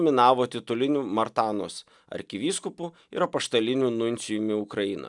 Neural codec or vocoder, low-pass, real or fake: none; 10.8 kHz; real